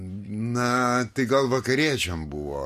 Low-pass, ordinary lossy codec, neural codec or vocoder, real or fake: 14.4 kHz; MP3, 64 kbps; none; real